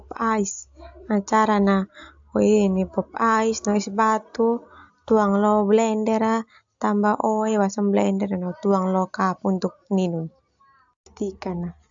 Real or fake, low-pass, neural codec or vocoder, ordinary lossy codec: real; 7.2 kHz; none; AAC, 64 kbps